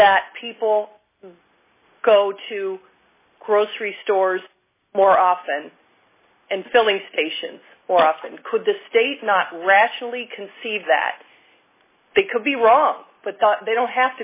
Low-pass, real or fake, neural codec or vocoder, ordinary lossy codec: 3.6 kHz; real; none; MP3, 16 kbps